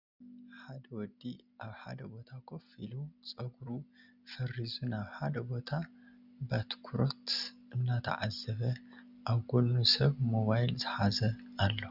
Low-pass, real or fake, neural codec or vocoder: 5.4 kHz; real; none